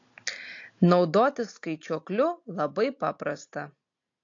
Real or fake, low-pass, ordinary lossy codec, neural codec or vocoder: real; 7.2 kHz; AAC, 48 kbps; none